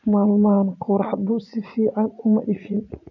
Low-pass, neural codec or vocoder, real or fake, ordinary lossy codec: 7.2 kHz; vocoder, 44.1 kHz, 80 mel bands, Vocos; fake; none